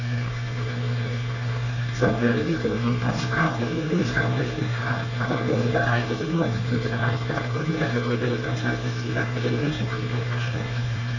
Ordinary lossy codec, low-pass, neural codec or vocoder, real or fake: none; 7.2 kHz; codec, 24 kHz, 1 kbps, SNAC; fake